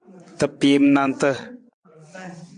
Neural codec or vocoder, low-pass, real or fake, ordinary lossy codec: vocoder, 22.05 kHz, 80 mel bands, WaveNeXt; 9.9 kHz; fake; MP3, 64 kbps